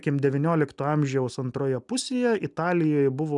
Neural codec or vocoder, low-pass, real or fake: none; 10.8 kHz; real